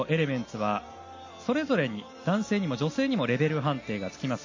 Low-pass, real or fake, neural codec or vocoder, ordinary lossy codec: 7.2 kHz; real; none; MP3, 32 kbps